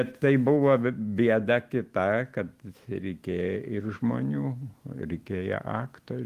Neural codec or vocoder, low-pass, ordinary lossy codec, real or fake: autoencoder, 48 kHz, 128 numbers a frame, DAC-VAE, trained on Japanese speech; 14.4 kHz; Opus, 24 kbps; fake